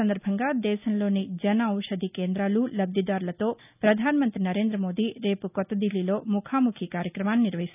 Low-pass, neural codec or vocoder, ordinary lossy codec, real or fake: 3.6 kHz; none; none; real